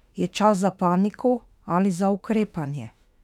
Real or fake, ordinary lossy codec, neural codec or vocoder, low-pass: fake; none; autoencoder, 48 kHz, 32 numbers a frame, DAC-VAE, trained on Japanese speech; 19.8 kHz